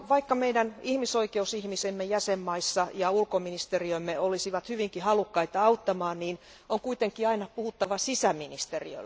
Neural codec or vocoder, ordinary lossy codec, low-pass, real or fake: none; none; none; real